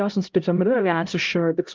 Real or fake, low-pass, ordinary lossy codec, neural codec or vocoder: fake; 7.2 kHz; Opus, 24 kbps; codec, 16 kHz, 0.5 kbps, X-Codec, HuBERT features, trained on LibriSpeech